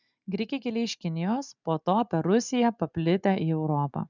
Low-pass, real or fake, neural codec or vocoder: 7.2 kHz; real; none